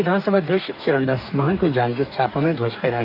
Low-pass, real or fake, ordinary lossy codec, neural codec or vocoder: 5.4 kHz; fake; none; codec, 44.1 kHz, 2.6 kbps, DAC